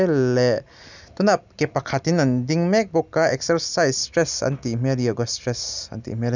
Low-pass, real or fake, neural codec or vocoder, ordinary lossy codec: 7.2 kHz; real; none; none